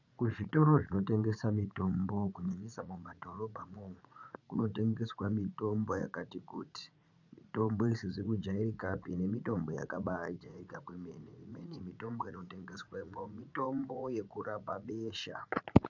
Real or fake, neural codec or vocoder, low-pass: fake; vocoder, 44.1 kHz, 80 mel bands, Vocos; 7.2 kHz